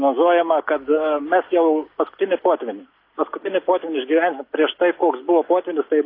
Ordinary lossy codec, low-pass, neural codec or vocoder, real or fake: AAC, 32 kbps; 5.4 kHz; none; real